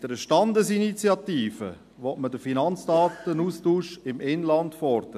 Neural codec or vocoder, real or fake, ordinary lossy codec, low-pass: none; real; AAC, 96 kbps; 14.4 kHz